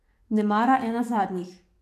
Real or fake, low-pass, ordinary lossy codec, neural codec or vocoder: fake; 14.4 kHz; AAC, 64 kbps; codec, 44.1 kHz, 7.8 kbps, DAC